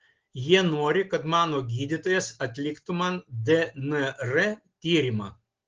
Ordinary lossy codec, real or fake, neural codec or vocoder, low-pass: Opus, 16 kbps; real; none; 7.2 kHz